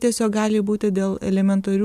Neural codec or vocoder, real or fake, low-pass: vocoder, 44.1 kHz, 128 mel bands every 256 samples, BigVGAN v2; fake; 14.4 kHz